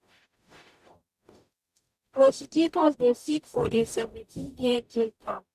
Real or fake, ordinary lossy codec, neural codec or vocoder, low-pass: fake; MP3, 96 kbps; codec, 44.1 kHz, 0.9 kbps, DAC; 14.4 kHz